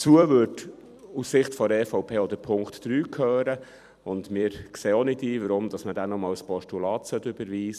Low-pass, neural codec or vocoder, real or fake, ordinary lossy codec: 14.4 kHz; vocoder, 44.1 kHz, 128 mel bands every 256 samples, BigVGAN v2; fake; none